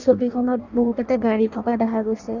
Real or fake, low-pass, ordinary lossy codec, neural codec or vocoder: fake; 7.2 kHz; none; codec, 16 kHz in and 24 kHz out, 0.6 kbps, FireRedTTS-2 codec